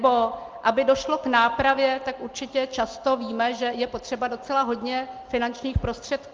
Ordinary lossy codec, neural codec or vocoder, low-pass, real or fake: Opus, 32 kbps; none; 7.2 kHz; real